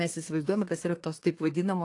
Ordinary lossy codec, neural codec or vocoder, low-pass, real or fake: AAC, 48 kbps; codec, 24 kHz, 1 kbps, SNAC; 10.8 kHz; fake